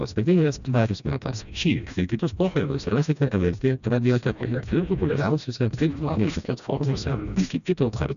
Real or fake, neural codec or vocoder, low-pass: fake; codec, 16 kHz, 1 kbps, FreqCodec, smaller model; 7.2 kHz